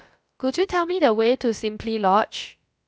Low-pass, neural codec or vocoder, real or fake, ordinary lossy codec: none; codec, 16 kHz, about 1 kbps, DyCAST, with the encoder's durations; fake; none